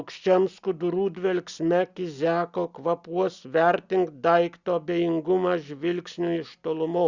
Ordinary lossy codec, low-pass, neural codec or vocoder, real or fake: Opus, 64 kbps; 7.2 kHz; none; real